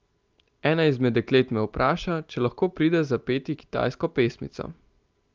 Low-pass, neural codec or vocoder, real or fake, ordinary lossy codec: 7.2 kHz; none; real; Opus, 24 kbps